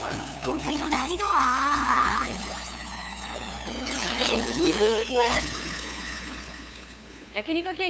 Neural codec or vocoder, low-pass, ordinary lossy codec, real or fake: codec, 16 kHz, 2 kbps, FunCodec, trained on LibriTTS, 25 frames a second; none; none; fake